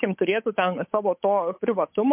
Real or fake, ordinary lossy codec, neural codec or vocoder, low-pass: fake; MP3, 32 kbps; codec, 16 kHz, 8 kbps, FunCodec, trained on Chinese and English, 25 frames a second; 3.6 kHz